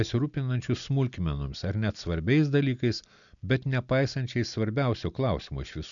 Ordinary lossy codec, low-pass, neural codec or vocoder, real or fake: MP3, 96 kbps; 7.2 kHz; none; real